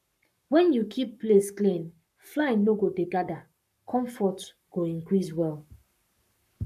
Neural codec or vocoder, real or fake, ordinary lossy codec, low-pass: codec, 44.1 kHz, 7.8 kbps, Pupu-Codec; fake; none; 14.4 kHz